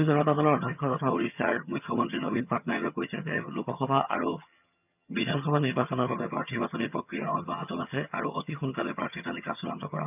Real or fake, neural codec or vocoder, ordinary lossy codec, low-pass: fake; vocoder, 22.05 kHz, 80 mel bands, HiFi-GAN; none; 3.6 kHz